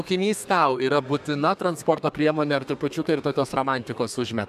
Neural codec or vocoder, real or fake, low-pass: codec, 32 kHz, 1.9 kbps, SNAC; fake; 14.4 kHz